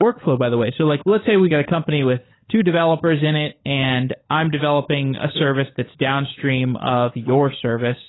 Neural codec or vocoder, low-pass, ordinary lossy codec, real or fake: codec, 16 kHz, 16 kbps, FunCodec, trained on LibriTTS, 50 frames a second; 7.2 kHz; AAC, 16 kbps; fake